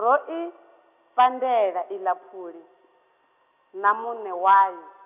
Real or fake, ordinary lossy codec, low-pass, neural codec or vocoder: real; none; 3.6 kHz; none